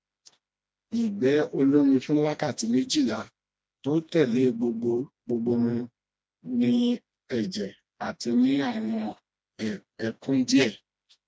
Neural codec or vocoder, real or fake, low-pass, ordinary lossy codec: codec, 16 kHz, 1 kbps, FreqCodec, smaller model; fake; none; none